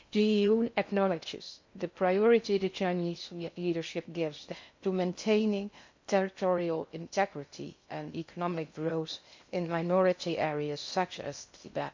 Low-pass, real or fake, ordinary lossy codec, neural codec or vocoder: 7.2 kHz; fake; MP3, 64 kbps; codec, 16 kHz in and 24 kHz out, 0.6 kbps, FocalCodec, streaming, 2048 codes